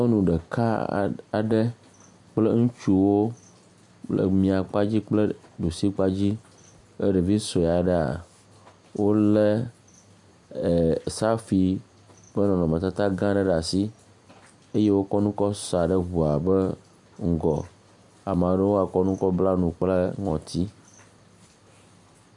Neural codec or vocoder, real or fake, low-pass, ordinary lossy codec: none; real; 10.8 kHz; MP3, 64 kbps